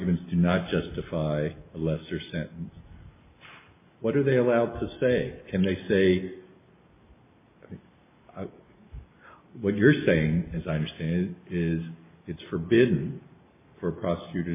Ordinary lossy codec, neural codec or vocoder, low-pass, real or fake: MP3, 24 kbps; none; 3.6 kHz; real